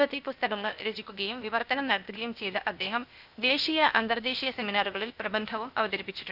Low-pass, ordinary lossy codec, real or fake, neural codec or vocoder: 5.4 kHz; none; fake; codec, 16 kHz, 0.8 kbps, ZipCodec